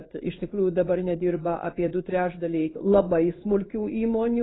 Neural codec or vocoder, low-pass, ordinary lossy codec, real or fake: codec, 16 kHz in and 24 kHz out, 1 kbps, XY-Tokenizer; 7.2 kHz; AAC, 16 kbps; fake